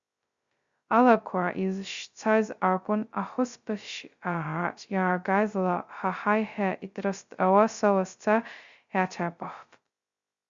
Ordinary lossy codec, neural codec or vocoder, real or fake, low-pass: Opus, 64 kbps; codec, 16 kHz, 0.2 kbps, FocalCodec; fake; 7.2 kHz